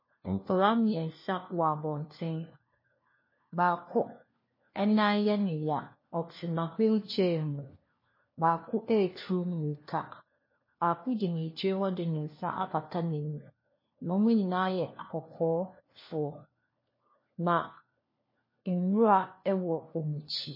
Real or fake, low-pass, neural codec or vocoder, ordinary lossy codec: fake; 5.4 kHz; codec, 16 kHz, 1 kbps, FunCodec, trained on LibriTTS, 50 frames a second; MP3, 24 kbps